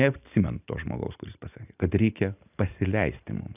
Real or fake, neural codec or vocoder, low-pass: real; none; 3.6 kHz